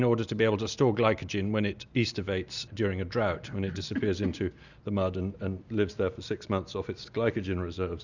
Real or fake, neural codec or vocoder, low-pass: real; none; 7.2 kHz